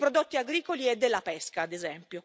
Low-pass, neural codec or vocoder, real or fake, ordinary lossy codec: none; none; real; none